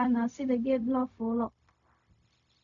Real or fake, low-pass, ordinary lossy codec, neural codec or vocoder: fake; 7.2 kHz; none; codec, 16 kHz, 0.4 kbps, LongCat-Audio-Codec